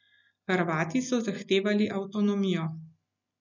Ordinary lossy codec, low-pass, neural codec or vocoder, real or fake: none; 7.2 kHz; none; real